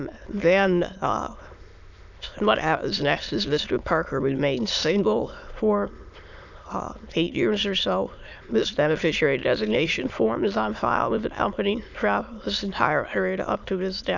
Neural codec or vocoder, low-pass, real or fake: autoencoder, 22.05 kHz, a latent of 192 numbers a frame, VITS, trained on many speakers; 7.2 kHz; fake